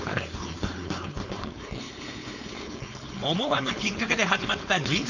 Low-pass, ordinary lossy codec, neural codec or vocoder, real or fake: 7.2 kHz; none; codec, 16 kHz, 4.8 kbps, FACodec; fake